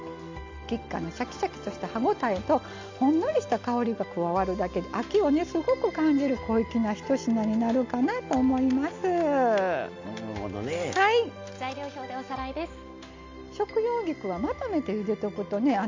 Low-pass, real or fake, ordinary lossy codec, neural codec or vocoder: 7.2 kHz; real; MP3, 64 kbps; none